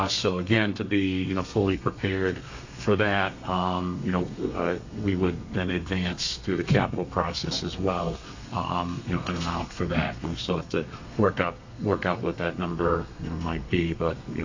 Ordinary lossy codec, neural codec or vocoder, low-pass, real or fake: AAC, 48 kbps; codec, 32 kHz, 1.9 kbps, SNAC; 7.2 kHz; fake